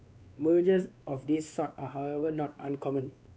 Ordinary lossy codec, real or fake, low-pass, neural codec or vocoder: none; fake; none; codec, 16 kHz, 2 kbps, X-Codec, WavLM features, trained on Multilingual LibriSpeech